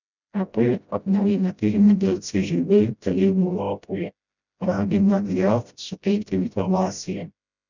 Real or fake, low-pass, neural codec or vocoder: fake; 7.2 kHz; codec, 16 kHz, 0.5 kbps, FreqCodec, smaller model